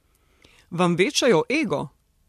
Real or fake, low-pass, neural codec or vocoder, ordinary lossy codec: fake; 14.4 kHz; vocoder, 44.1 kHz, 128 mel bands every 256 samples, BigVGAN v2; MP3, 64 kbps